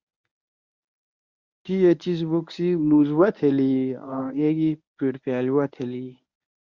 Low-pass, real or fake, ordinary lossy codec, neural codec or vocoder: 7.2 kHz; fake; Opus, 64 kbps; codec, 24 kHz, 0.9 kbps, WavTokenizer, medium speech release version 1